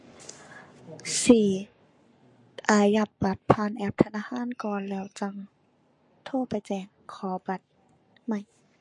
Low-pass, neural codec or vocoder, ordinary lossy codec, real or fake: 10.8 kHz; codec, 44.1 kHz, 7.8 kbps, Pupu-Codec; MP3, 48 kbps; fake